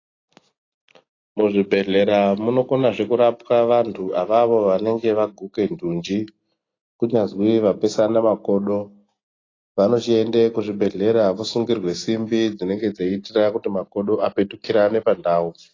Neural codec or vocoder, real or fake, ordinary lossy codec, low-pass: none; real; AAC, 32 kbps; 7.2 kHz